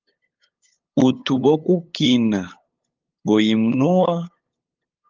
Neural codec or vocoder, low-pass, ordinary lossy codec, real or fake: codec, 16 kHz, 8 kbps, FunCodec, trained on LibriTTS, 25 frames a second; 7.2 kHz; Opus, 32 kbps; fake